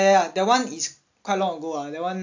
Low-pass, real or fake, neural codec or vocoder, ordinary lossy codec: 7.2 kHz; real; none; AAC, 48 kbps